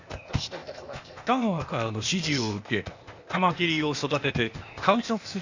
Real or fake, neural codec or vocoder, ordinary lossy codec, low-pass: fake; codec, 16 kHz, 0.8 kbps, ZipCodec; Opus, 64 kbps; 7.2 kHz